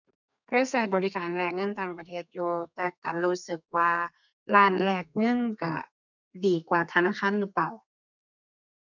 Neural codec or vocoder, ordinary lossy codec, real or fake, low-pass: codec, 32 kHz, 1.9 kbps, SNAC; none; fake; 7.2 kHz